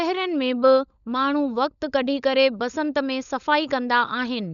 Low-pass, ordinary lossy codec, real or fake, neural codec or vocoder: 7.2 kHz; none; fake; codec, 16 kHz, 16 kbps, FunCodec, trained on LibriTTS, 50 frames a second